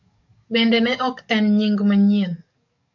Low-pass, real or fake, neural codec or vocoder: 7.2 kHz; fake; codec, 44.1 kHz, 7.8 kbps, DAC